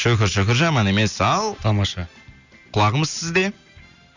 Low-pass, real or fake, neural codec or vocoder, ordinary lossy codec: 7.2 kHz; real; none; none